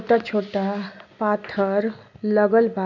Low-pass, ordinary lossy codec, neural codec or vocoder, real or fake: 7.2 kHz; none; none; real